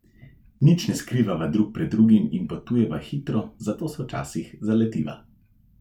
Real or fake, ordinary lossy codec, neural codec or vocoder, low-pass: fake; none; vocoder, 44.1 kHz, 128 mel bands every 512 samples, BigVGAN v2; 19.8 kHz